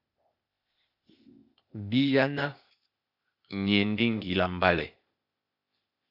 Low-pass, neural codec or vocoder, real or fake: 5.4 kHz; codec, 16 kHz, 0.8 kbps, ZipCodec; fake